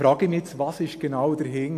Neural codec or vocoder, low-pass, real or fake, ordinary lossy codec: none; 14.4 kHz; real; AAC, 64 kbps